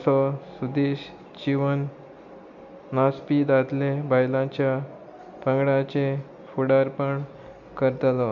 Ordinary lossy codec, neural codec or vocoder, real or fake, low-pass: none; none; real; 7.2 kHz